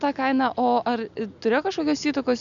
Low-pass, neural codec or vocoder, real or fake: 7.2 kHz; none; real